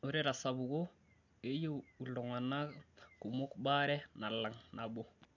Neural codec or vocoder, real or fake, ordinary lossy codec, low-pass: none; real; none; 7.2 kHz